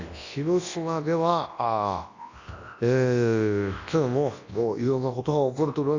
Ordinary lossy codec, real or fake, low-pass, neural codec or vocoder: AAC, 48 kbps; fake; 7.2 kHz; codec, 24 kHz, 0.9 kbps, WavTokenizer, large speech release